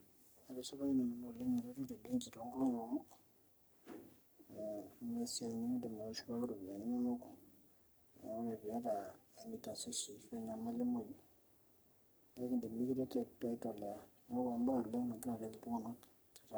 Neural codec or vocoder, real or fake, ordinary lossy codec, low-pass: codec, 44.1 kHz, 3.4 kbps, Pupu-Codec; fake; none; none